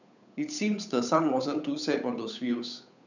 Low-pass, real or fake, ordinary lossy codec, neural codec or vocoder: 7.2 kHz; fake; none; codec, 16 kHz, 8 kbps, FunCodec, trained on Chinese and English, 25 frames a second